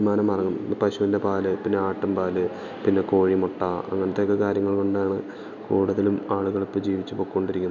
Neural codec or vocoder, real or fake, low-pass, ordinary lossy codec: none; real; 7.2 kHz; none